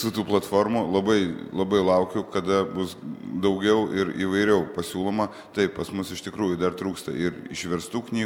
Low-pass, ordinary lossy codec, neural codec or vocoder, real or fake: 19.8 kHz; MP3, 96 kbps; none; real